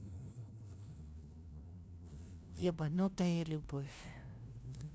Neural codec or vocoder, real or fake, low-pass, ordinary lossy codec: codec, 16 kHz, 0.5 kbps, FunCodec, trained on LibriTTS, 25 frames a second; fake; none; none